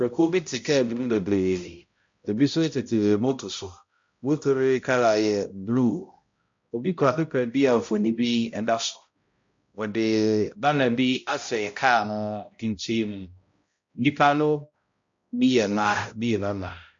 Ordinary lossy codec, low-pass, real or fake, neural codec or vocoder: MP3, 48 kbps; 7.2 kHz; fake; codec, 16 kHz, 0.5 kbps, X-Codec, HuBERT features, trained on balanced general audio